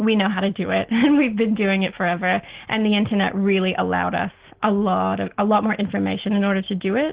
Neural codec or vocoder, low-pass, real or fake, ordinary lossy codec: none; 3.6 kHz; real; Opus, 16 kbps